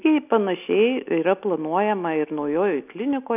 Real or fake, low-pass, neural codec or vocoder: real; 3.6 kHz; none